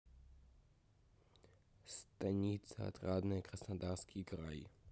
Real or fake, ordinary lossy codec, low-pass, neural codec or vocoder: real; none; none; none